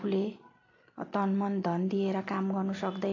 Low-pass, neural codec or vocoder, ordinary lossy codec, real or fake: 7.2 kHz; none; AAC, 32 kbps; real